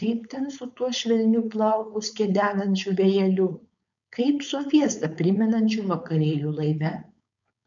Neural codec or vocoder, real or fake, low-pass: codec, 16 kHz, 4.8 kbps, FACodec; fake; 7.2 kHz